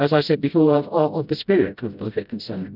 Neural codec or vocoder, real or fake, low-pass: codec, 16 kHz, 0.5 kbps, FreqCodec, smaller model; fake; 5.4 kHz